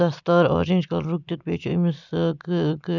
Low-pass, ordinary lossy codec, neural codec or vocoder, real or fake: 7.2 kHz; none; none; real